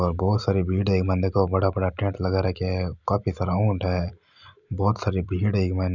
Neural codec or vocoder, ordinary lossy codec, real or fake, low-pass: none; none; real; 7.2 kHz